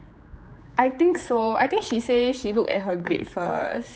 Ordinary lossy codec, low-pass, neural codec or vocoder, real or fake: none; none; codec, 16 kHz, 4 kbps, X-Codec, HuBERT features, trained on general audio; fake